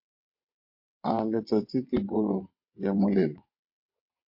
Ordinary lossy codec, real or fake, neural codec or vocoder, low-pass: MP3, 32 kbps; fake; vocoder, 22.05 kHz, 80 mel bands, WaveNeXt; 5.4 kHz